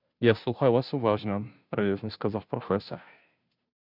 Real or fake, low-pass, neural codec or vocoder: fake; 5.4 kHz; codec, 16 kHz, 0.5 kbps, FunCodec, trained on Chinese and English, 25 frames a second